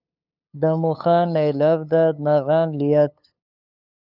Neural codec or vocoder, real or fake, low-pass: codec, 16 kHz, 8 kbps, FunCodec, trained on LibriTTS, 25 frames a second; fake; 5.4 kHz